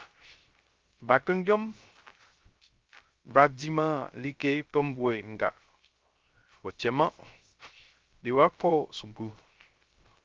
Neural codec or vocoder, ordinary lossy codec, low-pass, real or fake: codec, 16 kHz, 0.3 kbps, FocalCodec; Opus, 32 kbps; 7.2 kHz; fake